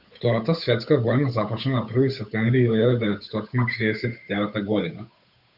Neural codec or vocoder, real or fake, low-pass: codec, 16 kHz, 8 kbps, FunCodec, trained on Chinese and English, 25 frames a second; fake; 5.4 kHz